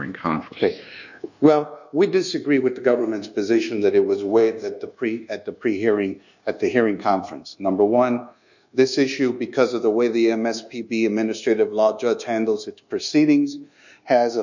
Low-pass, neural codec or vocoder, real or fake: 7.2 kHz; codec, 24 kHz, 1.2 kbps, DualCodec; fake